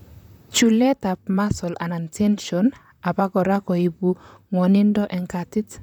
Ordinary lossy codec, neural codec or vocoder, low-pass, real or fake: none; vocoder, 44.1 kHz, 128 mel bands, Pupu-Vocoder; 19.8 kHz; fake